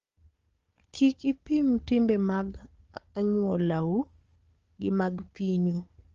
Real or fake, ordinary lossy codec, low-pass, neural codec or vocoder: fake; Opus, 32 kbps; 7.2 kHz; codec, 16 kHz, 4 kbps, FunCodec, trained on Chinese and English, 50 frames a second